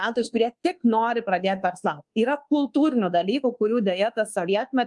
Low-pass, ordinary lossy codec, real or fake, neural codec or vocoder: 10.8 kHz; Opus, 32 kbps; fake; codec, 24 kHz, 1.2 kbps, DualCodec